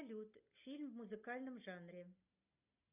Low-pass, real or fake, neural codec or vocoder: 3.6 kHz; real; none